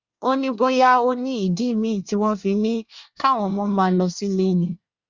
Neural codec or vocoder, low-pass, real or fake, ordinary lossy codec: codec, 24 kHz, 1 kbps, SNAC; 7.2 kHz; fake; Opus, 64 kbps